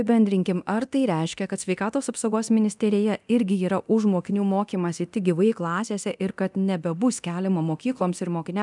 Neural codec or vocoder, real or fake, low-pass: codec, 24 kHz, 0.9 kbps, DualCodec; fake; 10.8 kHz